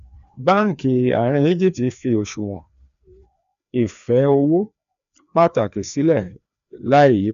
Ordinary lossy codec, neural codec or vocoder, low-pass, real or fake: none; codec, 16 kHz, 2 kbps, FreqCodec, larger model; 7.2 kHz; fake